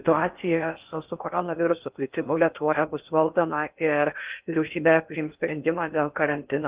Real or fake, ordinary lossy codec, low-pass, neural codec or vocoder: fake; Opus, 32 kbps; 3.6 kHz; codec, 16 kHz in and 24 kHz out, 0.6 kbps, FocalCodec, streaming, 4096 codes